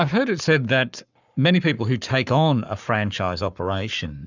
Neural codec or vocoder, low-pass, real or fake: codec, 44.1 kHz, 7.8 kbps, Pupu-Codec; 7.2 kHz; fake